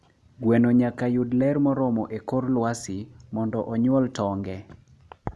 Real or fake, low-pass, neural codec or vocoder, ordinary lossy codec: real; none; none; none